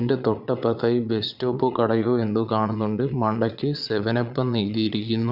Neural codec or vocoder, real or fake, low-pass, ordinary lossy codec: vocoder, 22.05 kHz, 80 mel bands, Vocos; fake; 5.4 kHz; none